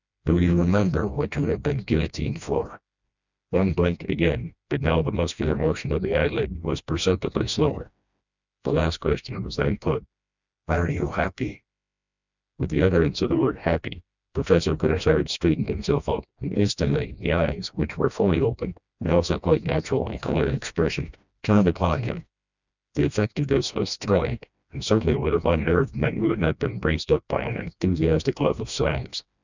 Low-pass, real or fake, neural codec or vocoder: 7.2 kHz; fake; codec, 16 kHz, 1 kbps, FreqCodec, smaller model